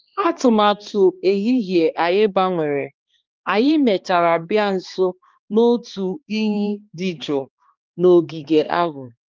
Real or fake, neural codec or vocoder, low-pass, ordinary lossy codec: fake; codec, 16 kHz, 2 kbps, X-Codec, HuBERT features, trained on balanced general audio; 7.2 kHz; Opus, 32 kbps